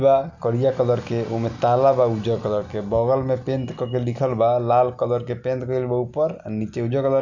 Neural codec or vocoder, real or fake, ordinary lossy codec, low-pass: none; real; none; 7.2 kHz